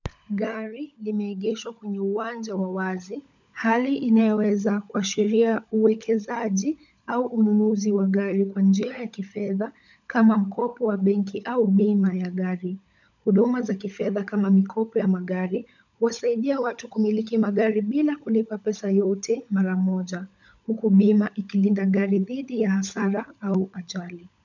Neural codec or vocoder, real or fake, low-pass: codec, 16 kHz, 16 kbps, FunCodec, trained on LibriTTS, 50 frames a second; fake; 7.2 kHz